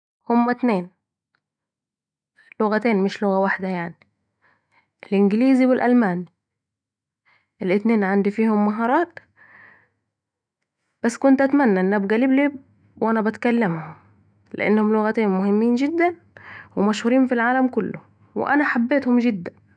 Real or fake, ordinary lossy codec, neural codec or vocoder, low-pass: fake; none; autoencoder, 48 kHz, 128 numbers a frame, DAC-VAE, trained on Japanese speech; 9.9 kHz